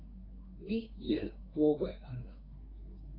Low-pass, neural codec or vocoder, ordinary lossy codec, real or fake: 5.4 kHz; codec, 24 kHz, 1 kbps, SNAC; AAC, 24 kbps; fake